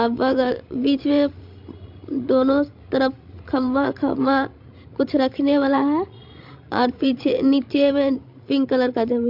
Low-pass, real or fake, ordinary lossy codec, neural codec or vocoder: 5.4 kHz; fake; MP3, 48 kbps; codec, 16 kHz, 16 kbps, FreqCodec, larger model